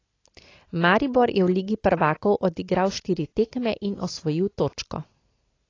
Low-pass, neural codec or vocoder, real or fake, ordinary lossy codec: 7.2 kHz; none; real; AAC, 32 kbps